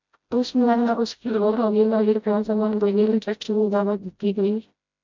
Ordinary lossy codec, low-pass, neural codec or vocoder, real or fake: MP3, 64 kbps; 7.2 kHz; codec, 16 kHz, 0.5 kbps, FreqCodec, smaller model; fake